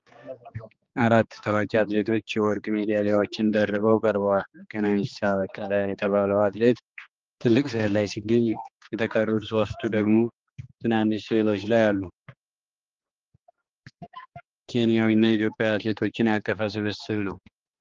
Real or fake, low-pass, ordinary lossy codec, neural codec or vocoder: fake; 7.2 kHz; Opus, 24 kbps; codec, 16 kHz, 2 kbps, X-Codec, HuBERT features, trained on general audio